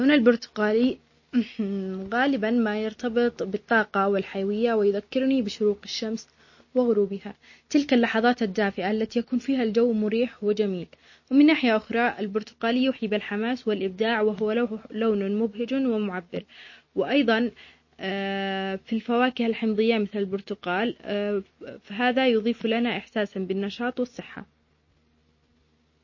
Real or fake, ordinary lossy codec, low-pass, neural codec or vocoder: real; MP3, 32 kbps; 7.2 kHz; none